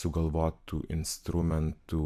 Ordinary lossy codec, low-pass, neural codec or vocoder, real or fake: AAC, 96 kbps; 14.4 kHz; vocoder, 44.1 kHz, 128 mel bands every 256 samples, BigVGAN v2; fake